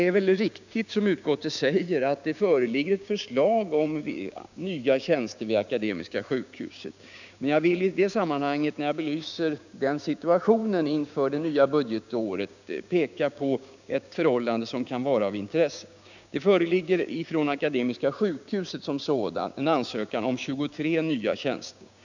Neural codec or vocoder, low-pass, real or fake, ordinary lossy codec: codec, 16 kHz, 6 kbps, DAC; 7.2 kHz; fake; none